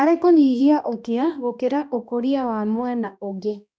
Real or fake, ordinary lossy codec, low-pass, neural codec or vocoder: fake; none; none; codec, 16 kHz, 1 kbps, X-Codec, HuBERT features, trained on balanced general audio